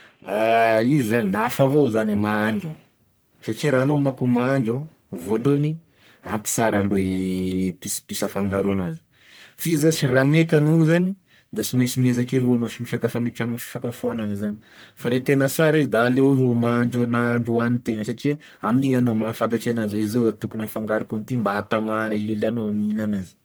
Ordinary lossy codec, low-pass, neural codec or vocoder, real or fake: none; none; codec, 44.1 kHz, 1.7 kbps, Pupu-Codec; fake